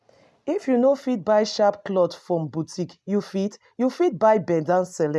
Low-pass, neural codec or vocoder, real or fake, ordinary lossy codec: none; none; real; none